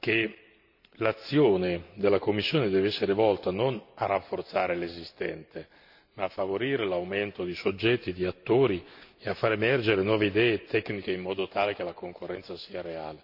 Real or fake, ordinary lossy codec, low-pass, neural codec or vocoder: real; none; 5.4 kHz; none